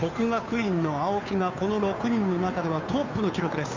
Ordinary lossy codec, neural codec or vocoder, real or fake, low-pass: MP3, 64 kbps; codec, 16 kHz, 2 kbps, FunCodec, trained on Chinese and English, 25 frames a second; fake; 7.2 kHz